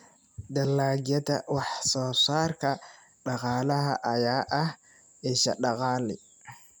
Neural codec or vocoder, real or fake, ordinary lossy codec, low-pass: none; real; none; none